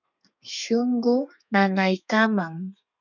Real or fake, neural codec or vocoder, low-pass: fake; codec, 32 kHz, 1.9 kbps, SNAC; 7.2 kHz